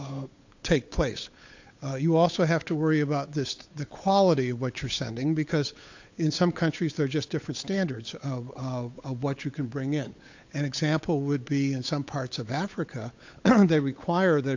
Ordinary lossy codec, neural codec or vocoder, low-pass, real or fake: AAC, 48 kbps; codec, 16 kHz, 8 kbps, FunCodec, trained on Chinese and English, 25 frames a second; 7.2 kHz; fake